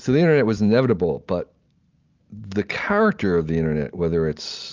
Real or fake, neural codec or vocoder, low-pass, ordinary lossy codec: real; none; 7.2 kHz; Opus, 24 kbps